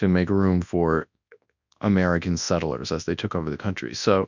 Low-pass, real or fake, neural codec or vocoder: 7.2 kHz; fake; codec, 24 kHz, 0.9 kbps, WavTokenizer, large speech release